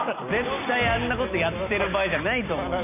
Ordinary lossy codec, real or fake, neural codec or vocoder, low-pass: none; real; none; 3.6 kHz